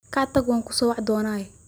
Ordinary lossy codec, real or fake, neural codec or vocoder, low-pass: none; real; none; none